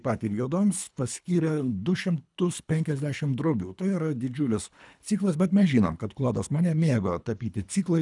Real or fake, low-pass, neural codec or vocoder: fake; 10.8 kHz; codec, 24 kHz, 3 kbps, HILCodec